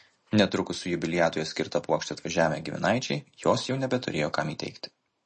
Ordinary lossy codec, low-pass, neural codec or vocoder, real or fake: MP3, 32 kbps; 9.9 kHz; none; real